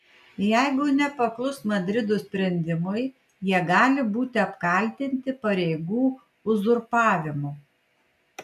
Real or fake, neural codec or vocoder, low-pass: real; none; 14.4 kHz